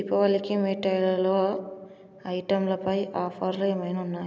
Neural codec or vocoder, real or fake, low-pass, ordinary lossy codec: none; real; none; none